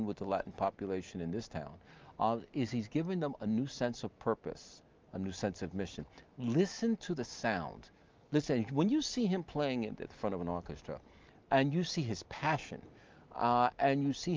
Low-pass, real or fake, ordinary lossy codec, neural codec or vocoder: 7.2 kHz; real; Opus, 24 kbps; none